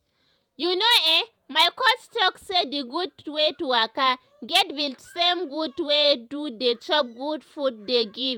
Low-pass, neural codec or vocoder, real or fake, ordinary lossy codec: none; vocoder, 48 kHz, 128 mel bands, Vocos; fake; none